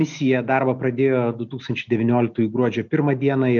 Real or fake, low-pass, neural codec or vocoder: real; 7.2 kHz; none